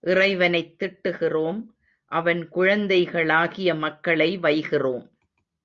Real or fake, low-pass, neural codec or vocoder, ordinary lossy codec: real; 7.2 kHz; none; Opus, 64 kbps